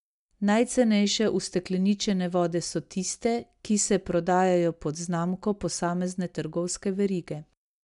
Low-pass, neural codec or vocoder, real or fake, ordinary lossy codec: 10.8 kHz; none; real; none